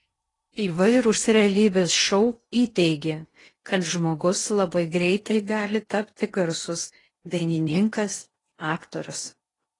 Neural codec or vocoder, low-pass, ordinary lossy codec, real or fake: codec, 16 kHz in and 24 kHz out, 0.8 kbps, FocalCodec, streaming, 65536 codes; 10.8 kHz; AAC, 32 kbps; fake